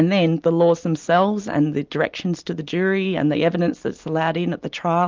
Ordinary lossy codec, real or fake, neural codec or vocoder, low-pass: Opus, 24 kbps; real; none; 7.2 kHz